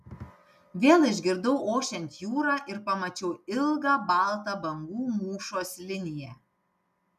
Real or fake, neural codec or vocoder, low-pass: real; none; 14.4 kHz